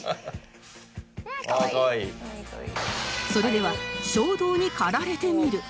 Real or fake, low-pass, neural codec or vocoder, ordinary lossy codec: real; none; none; none